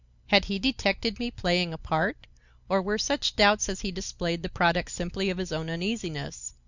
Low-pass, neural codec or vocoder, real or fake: 7.2 kHz; none; real